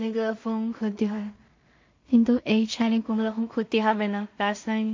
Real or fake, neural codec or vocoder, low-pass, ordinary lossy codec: fake; codec, 16 kHz in and 24 kHz out, 0.4 kbps, LongCat-Audio-Codec, two codebook decoder; 7.2 kHz; MP3, 48 kbps